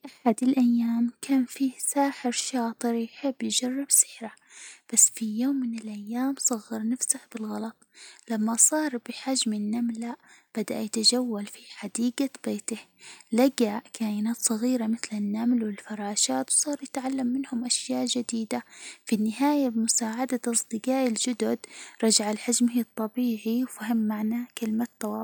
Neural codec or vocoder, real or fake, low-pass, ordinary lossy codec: none; real; none; none